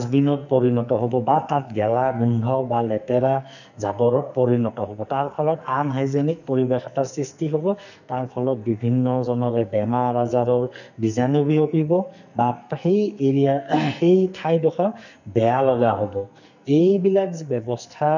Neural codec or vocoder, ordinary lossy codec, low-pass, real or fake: codec, 44.1 kHz, 2.6 kbps, SNAC; none; 7.2 kHz; fake